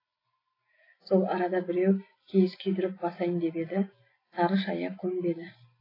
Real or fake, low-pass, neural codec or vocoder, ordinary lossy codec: real; 5.4 kHz; none; AAC, 24 kbps